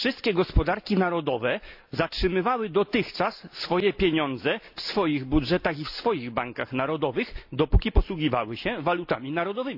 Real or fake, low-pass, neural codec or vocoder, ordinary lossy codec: real; 5.4 kHz; none; AAC, 48 kbps